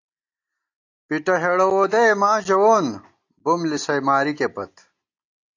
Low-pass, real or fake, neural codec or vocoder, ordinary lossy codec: 7.2 kHz; real; none; AAC, 48 kbps